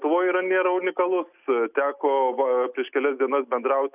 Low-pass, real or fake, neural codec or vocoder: 3.6 kHz; real; none